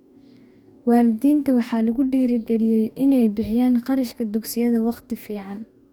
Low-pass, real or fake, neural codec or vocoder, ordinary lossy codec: 19.8 kHz; fake; codec, 44.1 kHz, 2.6 kbps, DAC; none